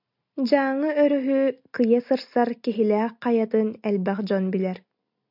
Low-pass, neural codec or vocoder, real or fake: 5.4 kHz; none; real